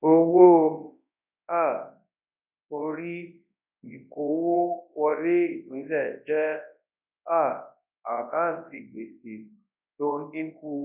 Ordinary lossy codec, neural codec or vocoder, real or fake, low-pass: none; codec, 24 kHz, 0.9 kbps, WavTokenizer, large speech release; fake; 3.6 kHz